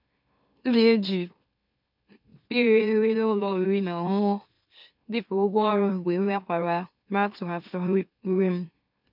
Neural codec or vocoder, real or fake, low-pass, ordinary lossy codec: autoencoder, 44.1 kHz, a latent of 192 numbers a frame, MeloTTS; fake; 5.4 kHz; none